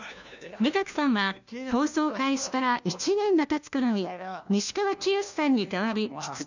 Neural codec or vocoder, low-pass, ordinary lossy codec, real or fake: codec, 16 kHz, 1 kbps, FunCodec, trained on LibriTTS, 50 frames a second; 7.2 kHz; none; fake